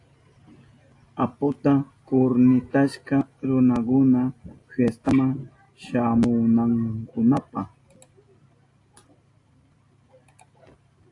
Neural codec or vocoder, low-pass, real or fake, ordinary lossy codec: none; 10.8 kHz; real; AAC, 48 kbps